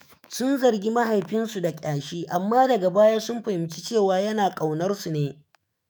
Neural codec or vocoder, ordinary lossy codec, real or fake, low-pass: autoencoder, 48 kHz, 128 numbers a frame, DAC-VAE, trained on Japanese speech; none; fake; none